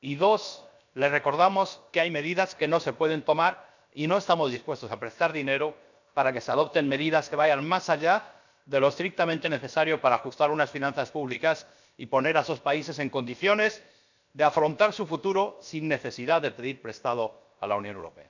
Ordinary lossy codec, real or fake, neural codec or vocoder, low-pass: none; fake; codec, 16 kHz, about 1 kbps, DyCAST, with the encoder's durations; 7.2 kHz